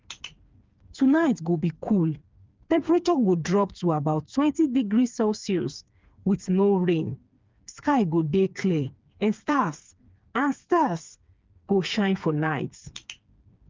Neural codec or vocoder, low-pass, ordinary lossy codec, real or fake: codec, 16 kHz, 4 kbps, FreqCodec, smaller model; 7.2 kHz; Opus, 24 kbps; fake